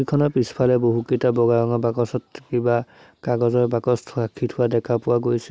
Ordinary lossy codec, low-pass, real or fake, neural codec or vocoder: none; none; real; none